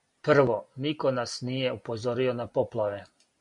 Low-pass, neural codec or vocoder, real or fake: 10.8 kHz; none; real